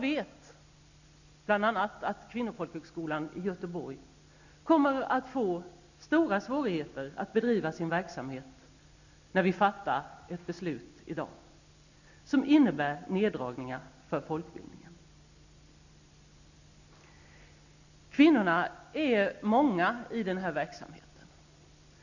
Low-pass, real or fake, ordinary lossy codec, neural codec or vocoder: 7.2 kHz; real; none; none